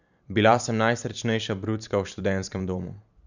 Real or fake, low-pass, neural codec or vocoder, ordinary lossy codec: fake; 7.2 kHz; vocoder, 44.1 kHz, 128 mel bands every 512 samples, BigVGAN v2; none